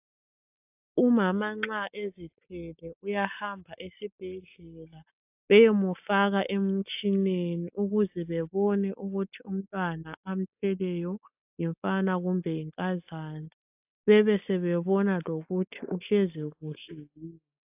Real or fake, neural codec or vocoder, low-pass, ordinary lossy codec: real; none; 3.6 kHz; AAC, 32 kbps